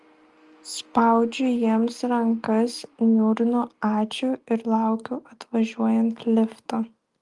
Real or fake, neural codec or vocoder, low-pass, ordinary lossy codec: real; none; 10.8 kHz; Opus, 32 kbps